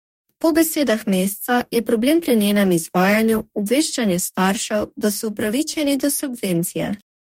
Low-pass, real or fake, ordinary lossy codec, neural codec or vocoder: 19.8 kHz; fake; MP3, 64 kbps; codec, 44.1 kHz, 2.6 kbps, DAC